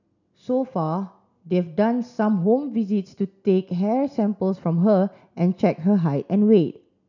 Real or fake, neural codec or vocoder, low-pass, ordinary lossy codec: real; none; 7.2 kHz; none